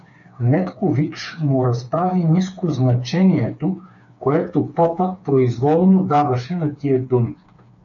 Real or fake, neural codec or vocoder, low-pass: fake; codec, 16 kHz, 4 kbps, FreqCodec, smaller model; 7.2 kHz